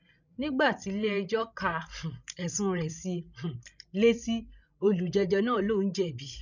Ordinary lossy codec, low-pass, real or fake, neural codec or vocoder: none; 7.2 kHz; fake; codec, 16 kHz, 16 kbps, FreqCodec, larger model